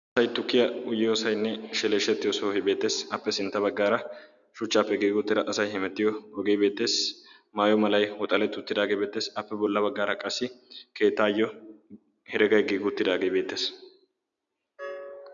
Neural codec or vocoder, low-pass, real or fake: none; 7.2 kHz; real